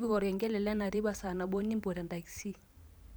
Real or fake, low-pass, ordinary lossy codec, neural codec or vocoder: fake; none; none; vocoder, 44.1 kHz, 128 mel bands every 512 samples, BigVGAN v2